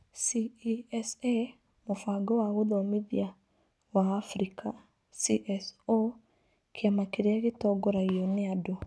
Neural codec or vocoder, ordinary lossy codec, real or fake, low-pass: none; none; real; none